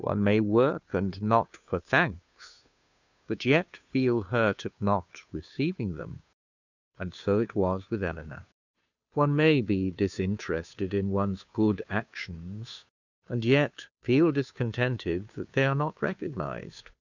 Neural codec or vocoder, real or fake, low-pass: codec, 16 kHz, 2 kbps, FunCodec, trained on Chinese and English, 25 frames a second; fake; 7.2 kHz